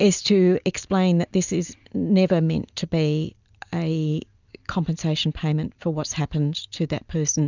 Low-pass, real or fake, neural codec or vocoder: 7.2 kHz; real; none